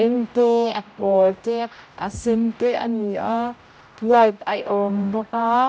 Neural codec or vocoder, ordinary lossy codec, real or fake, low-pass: codec, 16 kHz, 0.5 kbps, X-Codec, HuBERT features, trained on general audio; none; fake; none